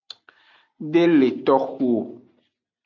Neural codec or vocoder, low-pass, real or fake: none; 7.2 kHz; real